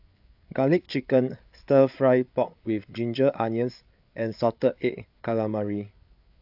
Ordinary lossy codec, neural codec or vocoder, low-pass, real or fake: AAC, 48 kbps; codec, 16 kHz, 8 kbps, FreqCodec, larger model; 5.4 kHz; fake